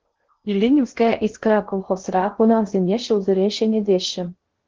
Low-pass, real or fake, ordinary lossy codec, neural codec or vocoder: 7.2 kHz; fake; Opus, 16 kbps; codec, 16 kHz in and 24 kHz out, 0.6 kbps, FocalCodec, streaming, 2048 codes